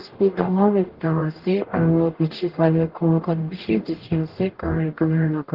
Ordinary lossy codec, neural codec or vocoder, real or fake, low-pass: Opus, 16 kbps; codec, 44.1 kHz, 0.9 kbps, DAC; fake; 5.4 kHz